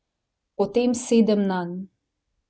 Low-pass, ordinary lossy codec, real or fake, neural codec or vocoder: none; none; real; none